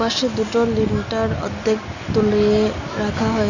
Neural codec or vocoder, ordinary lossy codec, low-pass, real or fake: none; none; 7.2 kHz; real